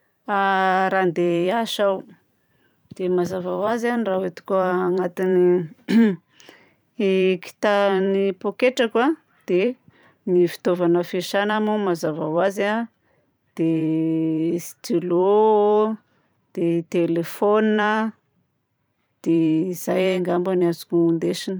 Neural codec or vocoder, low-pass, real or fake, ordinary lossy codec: vocoder, 44.1 kHz, 128 mel bands every 512 samples, BigVGAN v2; none; fake; none